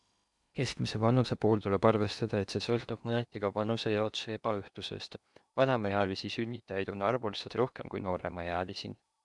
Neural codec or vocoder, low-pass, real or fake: codec, 16 kHz in and 24 kHz out, 0.8 kbps, FocalCodec, streaming, 65536 codes; 10.8 kHz; fake